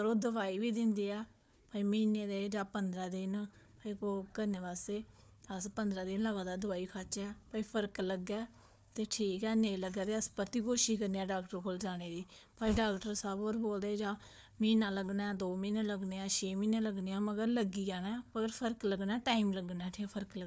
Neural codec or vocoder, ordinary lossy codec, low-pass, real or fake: codec, 16 kHz, 4 kbps, FunCodec, trained on Chinese and English, 50 frames a second; none; none; fake